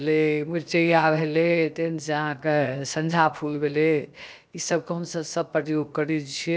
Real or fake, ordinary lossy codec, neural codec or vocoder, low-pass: fake; none; codec, 16 kHz, 0.7 kbps, FocalCodec; none